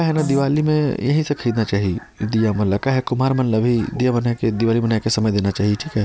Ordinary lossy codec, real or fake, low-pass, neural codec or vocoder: none; real; none; none